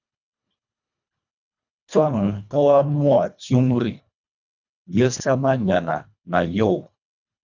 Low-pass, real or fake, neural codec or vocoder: 7.2 kHz; fake; codec, 24 kHz, 1.5 kbps, HILCodec